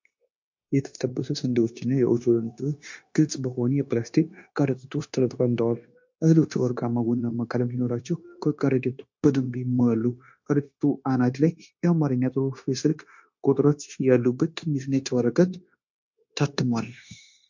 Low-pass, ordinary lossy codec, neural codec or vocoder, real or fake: 7.2 kHz; MP3, 48 kbps; codec, 16 kHz, 0.9 kbps, LongCat-Audio-Codec; fake